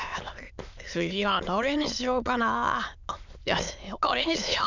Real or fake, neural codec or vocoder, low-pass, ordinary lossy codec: fake; autoencoder, 22.05 kHz, a latent of 192 numbers a frame, VITS, trained on many speakers; 7.2 kHz; none